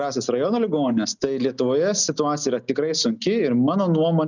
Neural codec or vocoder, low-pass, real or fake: none; 7.2 kHz; real